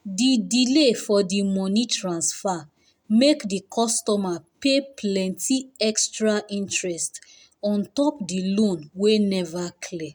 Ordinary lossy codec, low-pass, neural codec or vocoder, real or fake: none; 19.8 kHz; none; real